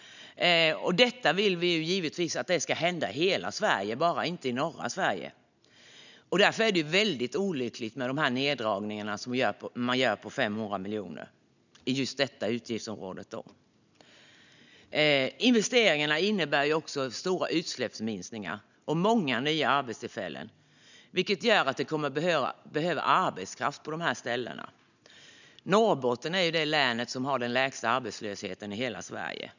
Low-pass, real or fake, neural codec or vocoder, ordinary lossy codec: 7.2 kHz; real; none; none